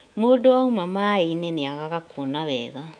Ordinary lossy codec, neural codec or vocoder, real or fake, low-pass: none; codec, 24 kHz, 3.1 kbps, DualCodec; fake; 9.9 kHz